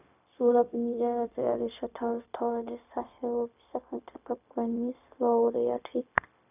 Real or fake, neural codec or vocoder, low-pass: fake; codec, 16 kHz, 0.4 kbps, LongCat-Audio-Codec; 3.6 kHz